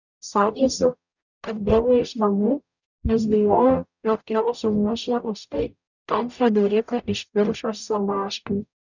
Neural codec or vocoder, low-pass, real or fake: codec, 44.1 kHz, 0.9 kbps, DAC; 7.2 kHz; fake